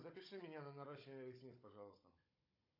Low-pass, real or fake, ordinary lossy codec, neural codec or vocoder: 5.4 kHz; fake; AAC, 32 kbps; codec, 16 kHz, 16 kbps, FunCodec, trained on Chinese and English, 50 frames a second